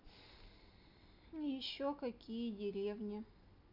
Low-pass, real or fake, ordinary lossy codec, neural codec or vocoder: 5.4 kHz; real; none; none